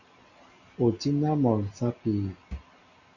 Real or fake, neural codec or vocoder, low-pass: real; none; 7.2 kHz